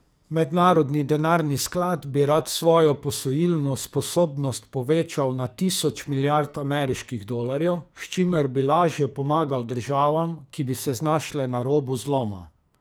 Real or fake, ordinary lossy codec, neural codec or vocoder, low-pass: fake; none; codec, 44.1 kHz, 2.6 kbps, SNAC; none